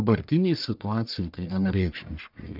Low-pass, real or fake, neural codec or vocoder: 5.4 kHz; fake; codec, 44.1 kHz, 1.7 kbps, Pupu-Codec